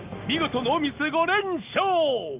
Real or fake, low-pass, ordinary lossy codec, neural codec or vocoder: real; 3.6 kHz; Opus, 24 kbps; none